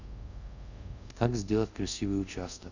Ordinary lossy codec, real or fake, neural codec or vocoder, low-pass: none; fake; codec, 24 kHz, 0.5 kbps, DualCodec; 7.2 kHz